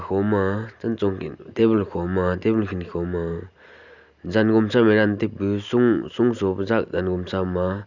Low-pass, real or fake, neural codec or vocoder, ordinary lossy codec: 7.2 kHz; real; none; Opus, 64 kbps